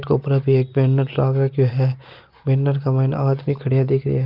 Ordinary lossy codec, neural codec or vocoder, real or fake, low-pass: Opus, 32 kbps; none; real; 5.4 kHz